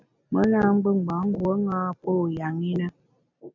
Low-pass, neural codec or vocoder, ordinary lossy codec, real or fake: 7.2 kHz; none; MP3, 64 kbps; real